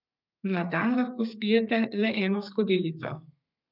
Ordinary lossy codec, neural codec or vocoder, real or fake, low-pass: none; codec, 32 kHz, 1.9 kbps, SNAC; fake; 5.4 kHz